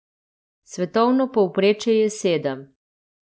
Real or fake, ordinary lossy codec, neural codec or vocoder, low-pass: real; none; none; none